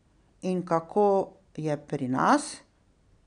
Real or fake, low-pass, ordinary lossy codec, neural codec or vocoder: real; 9.9 kHz; none; none